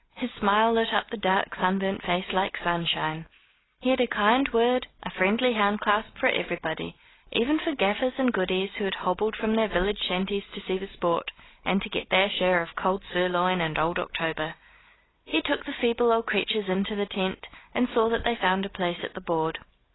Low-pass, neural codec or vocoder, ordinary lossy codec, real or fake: 7.2 kHz; none; AAC, 16 kbps; real